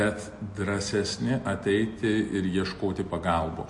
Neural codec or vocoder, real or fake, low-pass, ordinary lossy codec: none; real; 10.8 kHz; MP3, 48 kbps